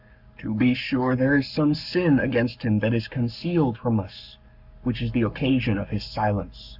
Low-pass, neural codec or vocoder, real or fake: 5.4 kHz; codec, 44.1 kHz, 7.8 kbps, Pupu-Codec; fake